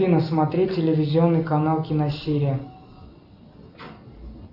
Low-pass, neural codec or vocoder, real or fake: 5.4 kHz; none; real